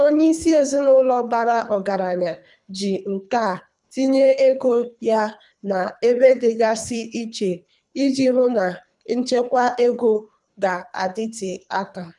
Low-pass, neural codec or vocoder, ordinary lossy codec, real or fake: 10.8 kHz; codec, 24 kHz, 3 kbps, HILCodec; none; fake